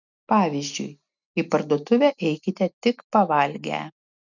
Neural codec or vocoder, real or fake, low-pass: none; real; 7.2 kHz